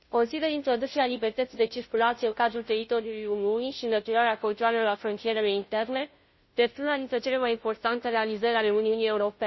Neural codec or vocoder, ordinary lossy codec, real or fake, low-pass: codec, 16 kHz, 0.5 kbps, FunCodec, trained on Chinese and English, 25 frames a second; MP3, 24 kbps; fake; 7.2 kHz